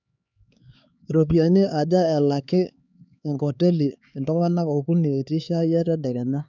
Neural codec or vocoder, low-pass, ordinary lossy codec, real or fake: codec, 16 kHz, 4 kbps, X-Codec, HuBERT features, trained on LibriSpeech; 7.2 kHz; none; fake